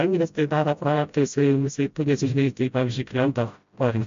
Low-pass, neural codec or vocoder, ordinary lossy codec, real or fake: 7.2 kHz; codec, 16 kHz, 0.5 kbps, FreqCodec, smaller model; MP3, 48 kbps; fake